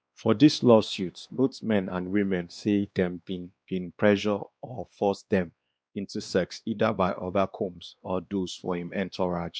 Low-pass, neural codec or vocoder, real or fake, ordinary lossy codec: none; codec, 16 kHz, 2 kbps, X-Codec, WavLM features, trained on Multilingual LibriSpeech; fake; none